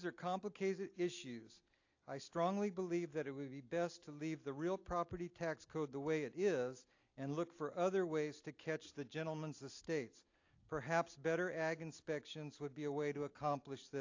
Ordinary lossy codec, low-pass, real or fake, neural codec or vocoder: AAC, 48 kbps; 7.2 kHz; real; none